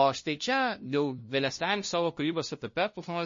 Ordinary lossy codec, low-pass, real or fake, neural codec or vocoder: MP3, 32 kbps; 7.2 kHz; fake; codec, 16 kHz, 0.5 kbps, FunCodec, trained on LibriTTS, 25 frames a second